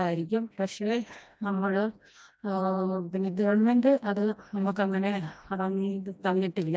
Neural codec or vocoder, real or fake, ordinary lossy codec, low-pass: codec, 16 kHz, 1 kbps, FreqCodec, smaller model; fake; none; none